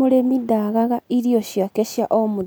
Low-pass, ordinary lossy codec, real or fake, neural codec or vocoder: none; none; real; none